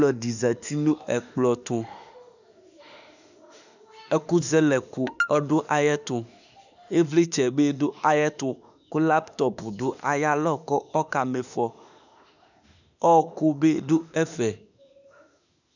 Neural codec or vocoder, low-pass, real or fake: autoencoder, 48 kHz, 32 numbers a frame, DAC-VAE, trained on Japanese speech; 7.2 kHz; fake